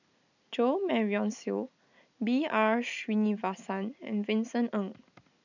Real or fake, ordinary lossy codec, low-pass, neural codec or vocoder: real; none; 7.2 kHz; none